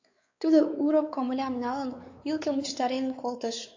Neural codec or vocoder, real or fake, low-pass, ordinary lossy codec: codec, 16 kHz, 4 kbps, X-Codec, WavLM features, trained on Multilingual LibriSpeech; fake; 7.2 kHz; AAC, 48 kbps